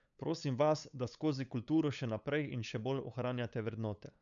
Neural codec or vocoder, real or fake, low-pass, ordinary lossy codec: codec, 16 kHz, 8 kbps, FunCodec, trained on LibriTTS, 25 frames a second; fake; 7.2 kHz; none